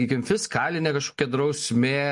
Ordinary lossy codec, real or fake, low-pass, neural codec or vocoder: MP3, 48 kbps; real; 10.8 kHz; none